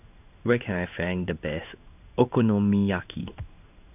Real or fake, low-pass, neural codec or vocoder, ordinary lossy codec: fake; 3.6 kHz; codec, 16 kHz in and 24 kHz out, 1 kbps, XY-Tokenizer; none